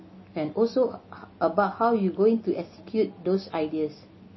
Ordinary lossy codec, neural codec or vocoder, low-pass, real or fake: MP3, 24 kbps; none; 7.2 kHz; real